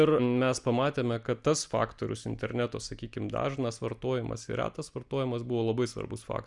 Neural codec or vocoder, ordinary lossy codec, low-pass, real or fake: none; Opus, 64 kbps; 10.8 kHz; real